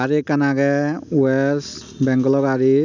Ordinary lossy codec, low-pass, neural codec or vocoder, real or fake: none; 7.2 kHz; none; real